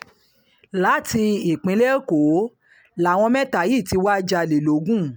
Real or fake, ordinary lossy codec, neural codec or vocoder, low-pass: real; none; none; none